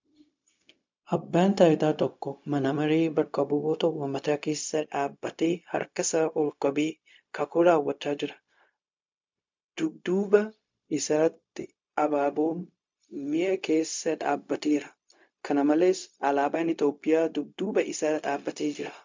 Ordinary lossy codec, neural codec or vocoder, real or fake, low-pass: MP3, 64 kbps; codec, 16 kHz, 0.4 kbps, LongCat-Audio-Codec; fake; 7.2 kHz